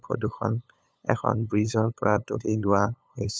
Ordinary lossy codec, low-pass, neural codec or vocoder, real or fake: none; none; codec, 16 kHz, 8 kbps, FunCodec, trained on LibriTTS, 25 frames a second; fake